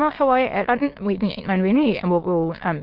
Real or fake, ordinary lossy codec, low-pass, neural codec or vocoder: fake; Opus, 16 kbps; 5.4 kHz; autoencoder, 22.05 kHz, a latent of 192 numbers a frame, VITS, trained on many speakers